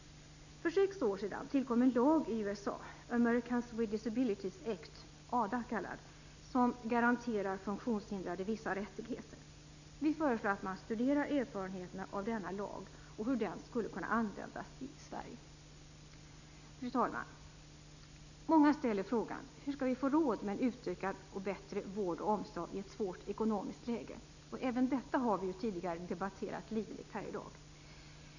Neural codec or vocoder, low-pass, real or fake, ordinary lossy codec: none; 7.2 kHz; real; none